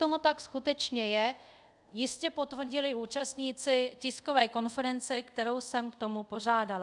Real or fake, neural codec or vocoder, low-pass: fake; codec, 24 kHz, 0.5 kbps, DualCodec; 10.8 kHz